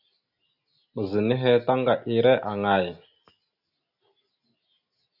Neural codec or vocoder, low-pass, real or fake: none; 5.4 kHz; real